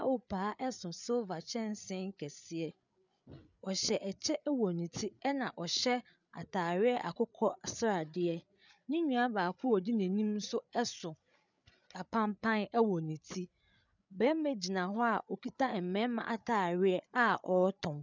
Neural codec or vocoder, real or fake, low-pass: codec, 16 kHz, 8 kbps, FreqCodec, larger model; fake; 7.2 kHz